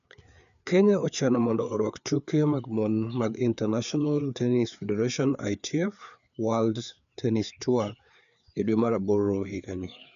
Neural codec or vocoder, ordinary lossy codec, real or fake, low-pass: codec, 16 kHz, 4 kbps, FreqCodec, larger model; none; fake; 7.2 kHz